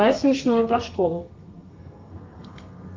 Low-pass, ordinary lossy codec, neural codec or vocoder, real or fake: 7.2 kHz; Opus, 24 kbps; codec, 32 kHz, 1.9 kbps, SNAC; fake